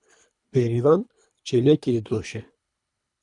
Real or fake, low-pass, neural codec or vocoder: fake; 10.8 kHz; codec, 24 kHz, 3 kbps, HILCodec